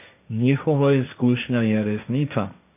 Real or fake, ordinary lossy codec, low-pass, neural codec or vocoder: fake; none; 3.6 kHz; codec, 16 kHz, 1.1 kbps, Voila-Tokenizer